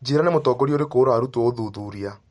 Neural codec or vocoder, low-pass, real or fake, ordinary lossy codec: none; 19.8 kHz; real; MP3, 48 kbps